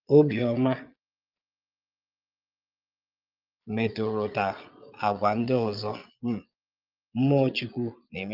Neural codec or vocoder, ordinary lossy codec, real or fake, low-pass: vocoder, 22.05 kHz, 80 mel bands, Vocos; Opus, 24 kbps; fake; 5.4 kHz